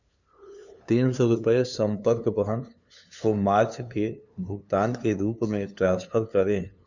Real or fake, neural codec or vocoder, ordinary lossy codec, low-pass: fake; codec, 16 kHz, 2 kbps, FunCodec, trained on LibriTTS, 25 frames a second; none; 7.2 kHz